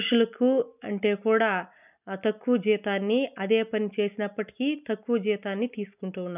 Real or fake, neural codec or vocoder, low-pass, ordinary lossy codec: real; none; 3.6 kHz; none